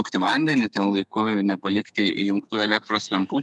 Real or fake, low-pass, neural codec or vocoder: fake; 10.8 kHz; codec, 32 kHz, 1.9 kbps, SNAC